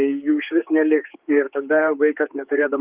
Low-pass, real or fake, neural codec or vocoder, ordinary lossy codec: 3.6 kHz; fake; codec, 16 kHz, 4 kbps, X-Codec, HuBERT features, trained on general audio; Opus, 32 kbps